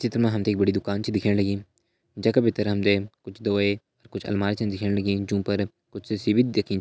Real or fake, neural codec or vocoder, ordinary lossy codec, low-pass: real; none; none; none